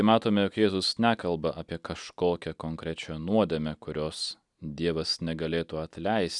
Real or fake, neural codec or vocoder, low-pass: real; none; 10.8 kHz